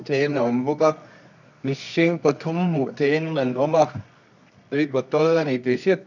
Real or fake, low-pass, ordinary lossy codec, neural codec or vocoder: fake; 7.2 kHz; none; codec, 24 kHz, 0.9 kbps, WavTokenizer, medium music audio release